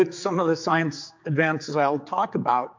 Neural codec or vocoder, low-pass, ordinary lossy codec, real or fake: codec, 16 kHz, 4 kbps, X-Codec, HuBERT features, trained on general audio; 7.2 kHz; MP3, 48 kbps; fake